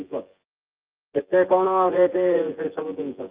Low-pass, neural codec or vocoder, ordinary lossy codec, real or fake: 3.6 kHz; vocoder, 24 kHz, 100 mel bands, Vocos; Opus, 24 kbps; fake